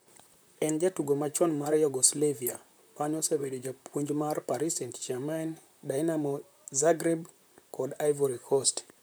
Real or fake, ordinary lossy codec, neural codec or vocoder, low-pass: fake; none; vocoder, 44.1 kHz, 128 mel bands, Pupu-Vocoder; none